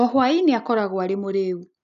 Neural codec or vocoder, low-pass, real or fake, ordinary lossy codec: none; 7.2 kHz; real; none